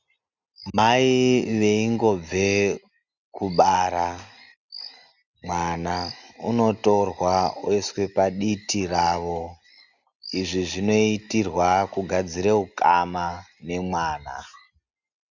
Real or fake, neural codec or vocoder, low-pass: real; none; 7.2 kHz